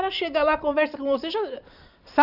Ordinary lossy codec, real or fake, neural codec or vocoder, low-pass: none; real; none; 5.4 kHz